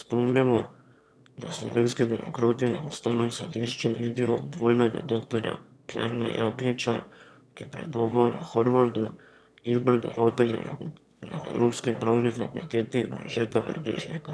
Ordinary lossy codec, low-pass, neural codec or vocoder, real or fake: none; none; autoencoder, 22.05 kHz, a latent of 192 numbers a frame, VITS, trained on one speaker; fake